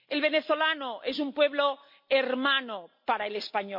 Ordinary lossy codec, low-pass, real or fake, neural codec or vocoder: MP3, 32 kbps; 5.4 kHz; real; none